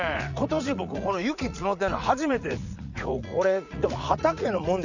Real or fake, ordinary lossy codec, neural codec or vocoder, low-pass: fake; none; vocoder, 44.1 kHz, 80 mel bands, Vocos; 7.2 kHz